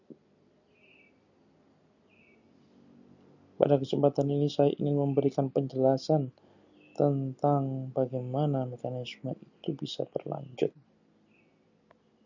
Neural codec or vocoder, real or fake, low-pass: none; real; 7.2 kHz